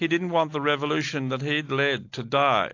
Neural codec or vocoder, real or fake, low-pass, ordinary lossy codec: none; real; 7.2 kHz; AAC, 48 kbps